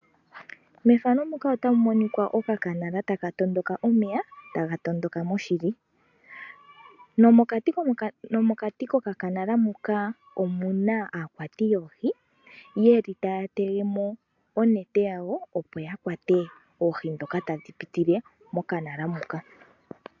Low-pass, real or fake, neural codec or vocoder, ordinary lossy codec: 7.2 kHz; real; none; MP3, 64 kbps